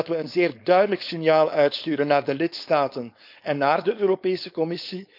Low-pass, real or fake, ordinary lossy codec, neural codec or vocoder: 5.4 kHz; fake; none; codec, 16 kHz, 4.8 kbps, FACodec